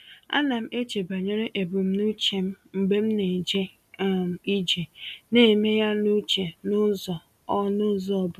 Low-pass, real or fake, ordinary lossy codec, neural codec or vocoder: 14.4 kHz; real; none; none